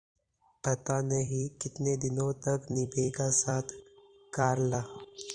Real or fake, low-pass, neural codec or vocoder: fake; 9.9 kHz; vocoder, 44.1 kHz, 128 mel bands every 256 samples, BigVGAN v2